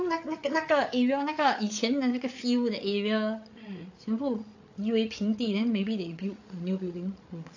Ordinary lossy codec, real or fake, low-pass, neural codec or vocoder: AAC, 48 kbps; fake; 7.2 kHz; codec, 16 kHz, 8 kbps, FreqCodec, larger model